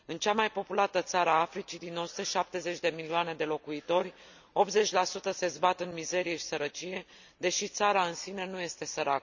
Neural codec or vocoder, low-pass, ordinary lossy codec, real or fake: none; 7.2 kHz; none; real